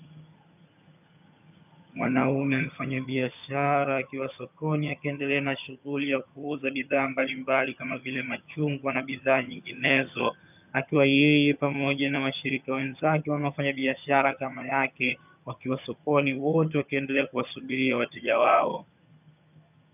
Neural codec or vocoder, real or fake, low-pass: vocoder, 22.05 kHz, 80 mel bands, HiFi-GAN; fake; 3.6 kHz